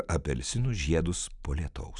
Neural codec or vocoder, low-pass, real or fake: none; 10.8 kHz; real